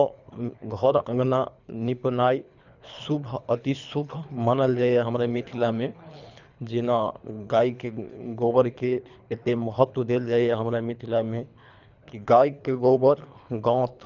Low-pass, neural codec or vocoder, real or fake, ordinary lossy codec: 7.2 kHz; codec, 24 kHz, 3 kbps, HILCodec; fake; none